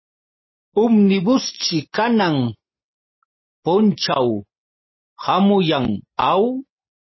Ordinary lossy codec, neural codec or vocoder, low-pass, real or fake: MP3, 24 kbps; none; 7.2 kHz; real